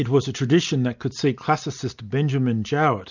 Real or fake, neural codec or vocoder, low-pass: real; none; 7.2 kHz